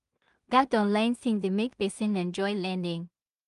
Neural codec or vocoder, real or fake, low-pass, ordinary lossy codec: codec, 16 kHz in and 24 kHz out, 0.4 kbps, LongCat-Audio-Codec, two codebook decoder; fake; 10.8 kHz; Opus, 32 kbps